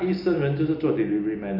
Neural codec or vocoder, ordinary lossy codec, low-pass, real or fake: none; MP3, 32 kbps; 5.4 kHz; real